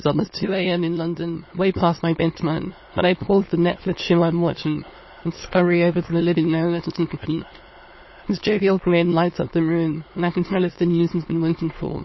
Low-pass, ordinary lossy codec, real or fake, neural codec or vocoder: 7.2 kHz; MP3, 24 kbps; fake; autoencoder, 22.05 kHz, a latent of 192 numbers a frame, VITS, trained on many speakers